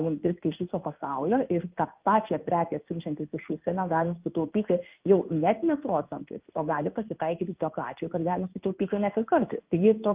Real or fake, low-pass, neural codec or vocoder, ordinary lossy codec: fake; 3.6 kHz; codec, 16 kHz in and 24 kHz out, 1 kbps, XY-Tokenizer; Opus, 24 kbps